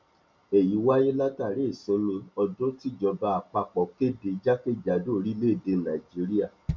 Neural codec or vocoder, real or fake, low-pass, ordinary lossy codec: none; real; 7.2 kHz; MP3, 64 kbps